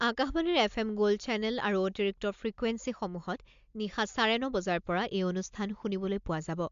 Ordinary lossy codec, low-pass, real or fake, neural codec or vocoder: MP3, 64 kbps; 7.2 kHz; real; none